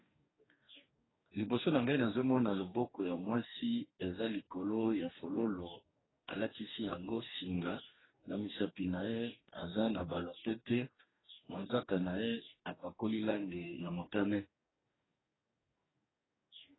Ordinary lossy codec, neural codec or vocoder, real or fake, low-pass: AAC, 16 kbps; codec, 44.1 kHz, 2.6 kbps, DAC; fake; 7.2 kHz